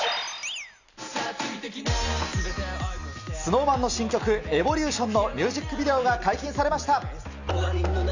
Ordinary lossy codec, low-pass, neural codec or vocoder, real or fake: none; 7.2 kHz; none; real